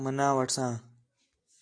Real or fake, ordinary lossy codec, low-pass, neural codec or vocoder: real; AAC, 48 kbps; 9.9 kHz; none